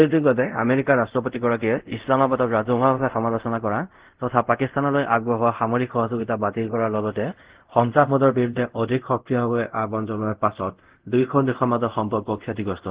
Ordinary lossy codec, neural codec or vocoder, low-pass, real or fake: Opus, 16 kbps; codec, 24 kHz, 0.5 kbps, DualCodec; 3.6 kHz; fake